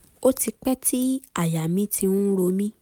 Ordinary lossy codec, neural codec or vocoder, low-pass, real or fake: none; none; none; real